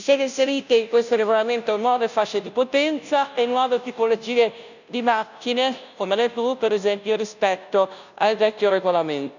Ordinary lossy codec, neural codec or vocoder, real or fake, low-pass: none; codec, 16 kHz, 0.5 kbps, FunCodec, trained on Chinese and English, 25 frames a second; fake; 7.2 kHz